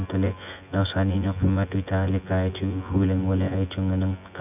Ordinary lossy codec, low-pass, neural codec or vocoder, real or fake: none; 3.6 kHz; vocoder, 24 kHz, 100 mel bands, Vocos; fake